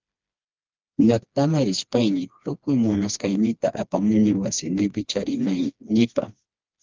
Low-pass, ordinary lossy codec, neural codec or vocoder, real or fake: 7.2 kHz; Opus, 24 kbps; codec, 16 kHz, 2 kbps, FreqCodec, smaller model; fake